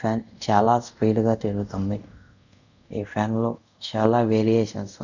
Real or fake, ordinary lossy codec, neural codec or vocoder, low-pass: fake; none; codec, 24 kHz, 0.5 kbps, DualCodec; 7.2 kHz